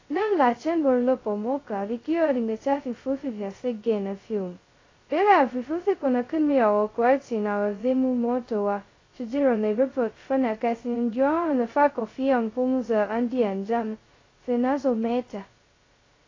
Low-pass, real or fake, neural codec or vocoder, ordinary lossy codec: 7.2 kHz; fake; codec, 16 kHz, 0.2 kbps, FocalCodec; AAC, 32 kbps